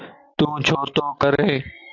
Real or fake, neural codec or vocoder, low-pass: real; none; 7.2 kHz